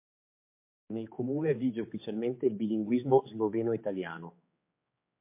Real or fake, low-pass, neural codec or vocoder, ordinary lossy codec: fake; 3.6 kHz; codec, 16 kHz, 4 kbps, X-Codec, HuBERT features, trained on general audio; MP3, 24 kbps